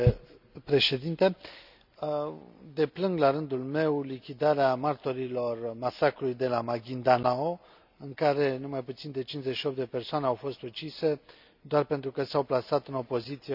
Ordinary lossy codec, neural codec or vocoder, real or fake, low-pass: none; none; real; 5.4 kHz